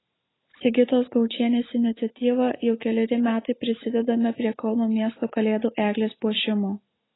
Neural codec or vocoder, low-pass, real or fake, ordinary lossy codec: none; 7.2 kHz; real; AAC, 16 kbps